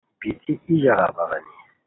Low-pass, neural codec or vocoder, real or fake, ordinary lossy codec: 7.2 kHz; none; real; AAC, 16 kbps